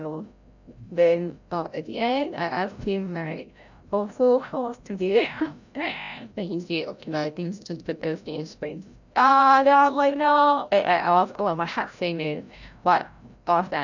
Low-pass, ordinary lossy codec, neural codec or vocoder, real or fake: 7.2 kHz; none; codec, 16 kHz, 0.5 kbps, FreqCodec, larger model; fake